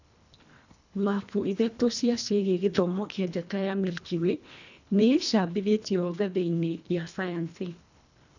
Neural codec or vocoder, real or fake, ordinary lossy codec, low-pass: codec, 24 kHz, 1.5 kbps, HILCodec; fake; none; 7.2 kHz